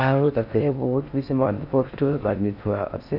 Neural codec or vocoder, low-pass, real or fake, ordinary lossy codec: codec, 16 kHz in and 24 kHz out, 0.6 kbps, FocalCodec, streaming, 4096 codes; 5.4 kHz; fake; none